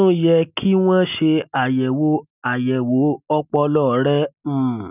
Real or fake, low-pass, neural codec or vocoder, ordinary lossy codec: real; 3.6 kHz; none; none